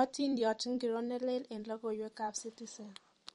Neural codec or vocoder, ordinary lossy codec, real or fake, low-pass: vocoder, 44.1 kHz, 128 mel bands every 256 samples, BigVGAN v2; MP3, 48 kbps; fake; 19.8 kHz